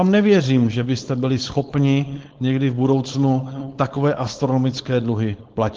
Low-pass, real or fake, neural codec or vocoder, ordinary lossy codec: 7.2 kHz; fake; codec, 16 kHz, 4.8 kbps, FACodec; Opus, 16 kbps